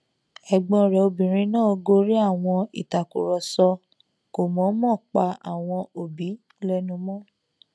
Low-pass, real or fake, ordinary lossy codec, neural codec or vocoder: none; real; none; none